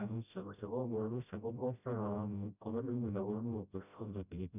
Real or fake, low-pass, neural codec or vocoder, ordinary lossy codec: fake; 3.6 kHz; codec, 16 kHz, 0.5 kbps, FreqCodec, smaller model; none